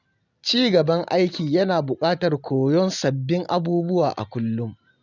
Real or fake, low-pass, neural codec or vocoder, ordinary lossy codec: real; 7.2 kHz; none; none